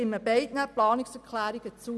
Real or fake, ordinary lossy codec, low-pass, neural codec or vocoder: real; none; none; none